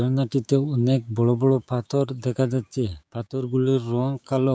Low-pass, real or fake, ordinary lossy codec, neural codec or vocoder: none; fake; none; codec, 16 kHz, 6 kbps, DAC